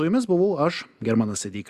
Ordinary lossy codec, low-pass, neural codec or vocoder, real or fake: Opus, 64 kbps; 14.4 kHz; none; real